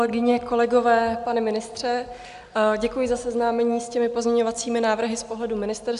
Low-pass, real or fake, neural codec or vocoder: 10.8 kHz; real; none